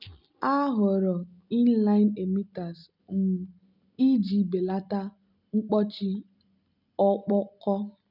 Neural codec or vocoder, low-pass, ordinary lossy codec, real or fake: none; 5.4 kHz; none; real